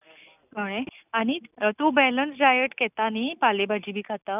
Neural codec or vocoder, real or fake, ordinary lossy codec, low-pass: none; real; none; 3.6 kHz